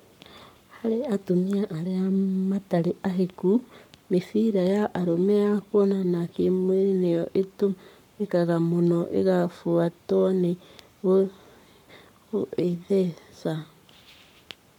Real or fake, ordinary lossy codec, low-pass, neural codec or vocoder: fake; none; 19.8 kHz; codec, 44.1 kHz, 7.8 kbps, Pupu-Codec